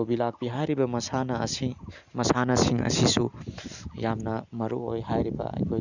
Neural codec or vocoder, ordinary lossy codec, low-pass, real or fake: none; none; 7.2 kHz; real